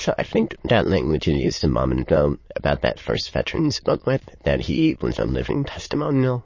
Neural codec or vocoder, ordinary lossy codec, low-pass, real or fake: autoencoder, 22.05 kHz, a latent of 192 numbers a frame, VITS, trained on many speakers; MP3, 32 kbps; 7.2 kHz; fake